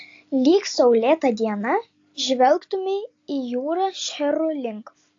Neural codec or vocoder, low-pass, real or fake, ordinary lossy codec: none; 7.2 kHz; real; AAC, 48 kbps